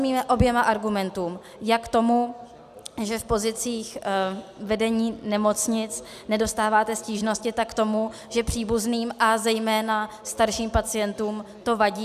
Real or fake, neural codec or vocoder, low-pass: real; none; 14.4 kHz